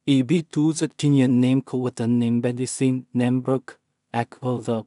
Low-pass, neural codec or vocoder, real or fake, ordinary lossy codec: 10.8 kHz; codec, 16 kHz in and 24 kHz out, 0.4 kbps, LongCat-Audio-Codec, two codebook decoder; fake; none